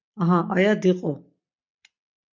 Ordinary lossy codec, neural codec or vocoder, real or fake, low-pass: MP3, 48 kbps; none; real; 7.2 kHz